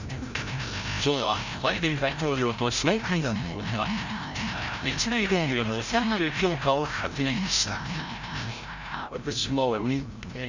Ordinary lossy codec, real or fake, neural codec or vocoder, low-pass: Opus, 64 kbps; fake; codec, 16 kHz, 0.5 kbps, FreqCodec, larger model; 7.2 kHz